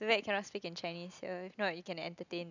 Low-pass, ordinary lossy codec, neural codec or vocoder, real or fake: 7.2 kHz; none; none; real